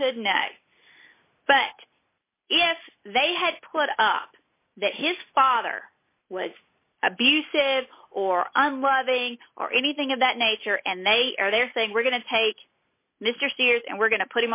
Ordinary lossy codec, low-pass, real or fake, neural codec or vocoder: MP3, 32 kbps; 3.6 kHz; real; none